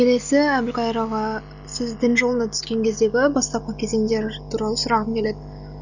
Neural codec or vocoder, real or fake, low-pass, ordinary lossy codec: none; real; 7.2 kHz; none